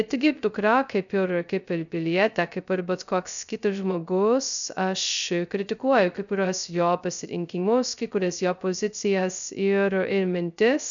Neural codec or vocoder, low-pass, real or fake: codec, 16 kHz, 0.2 kbps, FocalCodec; 7.2 kHz; fake